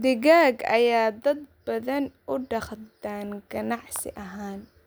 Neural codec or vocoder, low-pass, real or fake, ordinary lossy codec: none; none; real; none